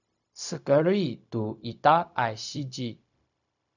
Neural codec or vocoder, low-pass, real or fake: codec, 16 kHz, 0.4 kbps, LongCat-Audio-Codec; 7.2 kHz; fake